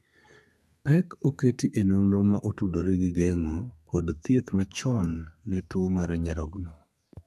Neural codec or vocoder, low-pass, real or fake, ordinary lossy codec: codec, 32 kHz, 1.9 kbps, SNAC; 14.4 kHz; fake; none